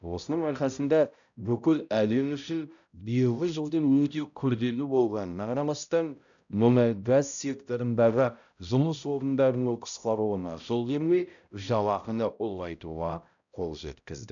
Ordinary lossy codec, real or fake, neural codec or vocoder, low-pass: none; fake; codec, 16 kHz, 0.5 kbps, X-Codec, HuBERT features, trained on balanced general audio; 7.2 kHz